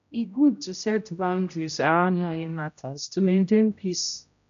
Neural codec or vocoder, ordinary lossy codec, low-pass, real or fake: codec, 16 kHz, 0.5 kbps, X-Codec, HuBERT features, trained on general audio; none; 7.2 kHz; fake